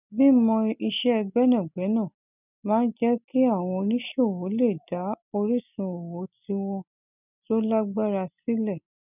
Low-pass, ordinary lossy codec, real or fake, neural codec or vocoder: 3.6 kHz; none; real; none